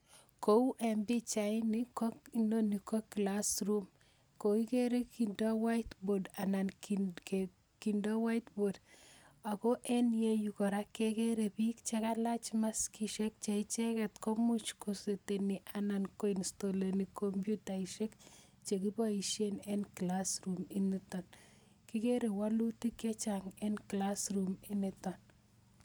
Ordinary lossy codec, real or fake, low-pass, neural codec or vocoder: none; real; none; none